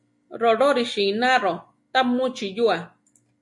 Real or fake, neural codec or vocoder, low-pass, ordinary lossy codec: real; none; 10.8 kHz; MP3, 48 kbps